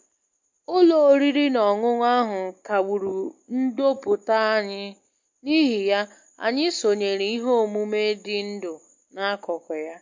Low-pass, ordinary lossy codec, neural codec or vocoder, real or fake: 7.2 kHz; MP3, 48 kbps; none; real